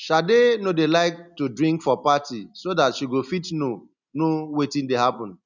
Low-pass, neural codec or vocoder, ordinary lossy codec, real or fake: 7.2 kHz; none; none; real